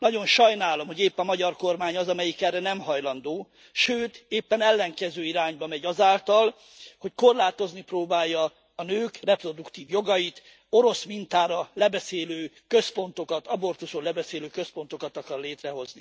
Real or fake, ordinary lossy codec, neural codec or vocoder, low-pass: real; none; none; none